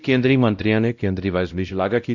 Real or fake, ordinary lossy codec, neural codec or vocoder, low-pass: fake; none; codec, 16 kHz, 0.5 kbps, X-Codec, WavLM features, trained on Multilingual LibriSpeech; 7.2 kHz